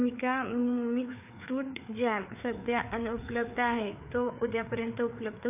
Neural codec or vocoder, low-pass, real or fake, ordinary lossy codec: codec, 16 kHz, 4 kbps, FreqCodec, larger model; 3.6 kHz; fake; AAC, 32 kbps